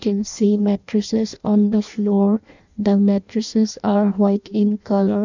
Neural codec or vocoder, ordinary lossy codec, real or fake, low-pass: codec, 16 kHz in and 24 kHz out, 0.6 kbps, FireRedTTS-2 codec; none; fake; 7.2 kHz